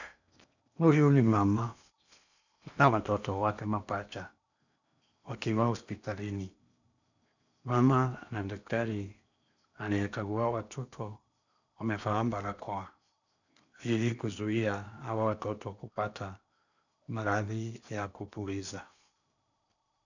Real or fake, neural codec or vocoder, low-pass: fake; codec, 16 kHz in and 24 kHz out, 0.8 kbps, FocalCodec, streaming, 65536 codes; 7.2 kHz